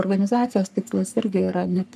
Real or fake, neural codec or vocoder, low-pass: fake; codec, 44.1 kHz, 3.4 kbps, Pupu-Codec; 14.4 kHz